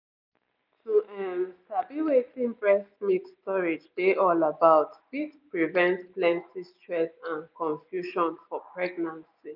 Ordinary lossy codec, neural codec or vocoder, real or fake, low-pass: none; none; real; 5.4 kHz